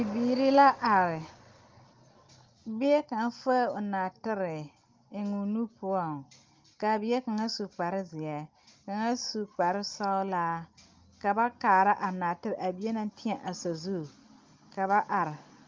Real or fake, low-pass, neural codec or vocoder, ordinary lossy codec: real; 7.2 kHz; none; Opus, 32 kbps